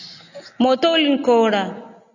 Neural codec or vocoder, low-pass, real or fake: none; 7.2 kHz; real